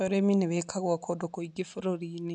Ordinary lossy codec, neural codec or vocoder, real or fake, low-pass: none; none; real; 9.9 kHz